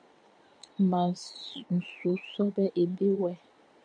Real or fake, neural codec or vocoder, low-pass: fake; vocoder, 22.05 kHz, 80 mel bands, Vocos; 9.9 kHz